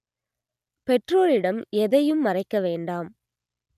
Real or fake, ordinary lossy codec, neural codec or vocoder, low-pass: real; none; none; 14.4 kHz